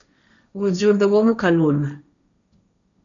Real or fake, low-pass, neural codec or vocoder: fake; 7.2 kHz; codec, 16 kHz, 1.1 kbps, Voila-Tokenizer